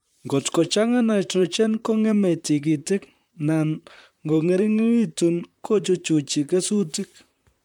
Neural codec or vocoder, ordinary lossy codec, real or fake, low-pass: vocoder, 44.1 kHz, 128 mel bands, Pupu-Vocoder; MP3, 96 kbps; fake; 19.8 kHz